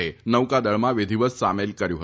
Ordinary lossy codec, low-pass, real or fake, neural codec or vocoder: none; none; real; none